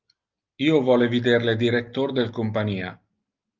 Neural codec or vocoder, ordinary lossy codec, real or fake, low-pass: none; Opus, 24 kbps; real; 7.2 kHz